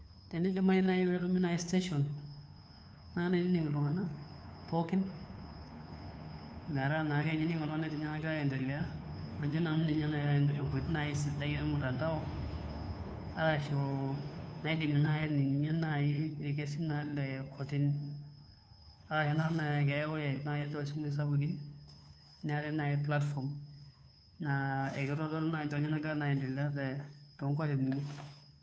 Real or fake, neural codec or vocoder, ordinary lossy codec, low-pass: fake; codec, 16 kHz, 2 kbps, FunCodec, trained on Chinese and English, 25 frames a second; none; none